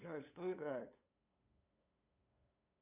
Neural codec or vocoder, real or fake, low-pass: codec, 16 kHz, 2 kbps, FunCodec, trained on LibriTTS, 25 frames a second; fake; 3.6 kHz